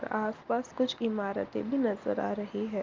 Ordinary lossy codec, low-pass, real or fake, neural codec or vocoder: Opus, 32 kbps; 7.2 kHz; real; none